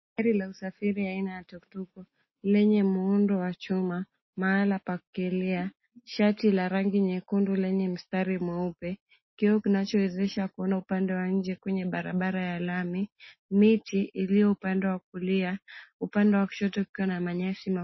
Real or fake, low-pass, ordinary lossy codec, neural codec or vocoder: real; 7.2 kHz; MP3, 24 kbps; none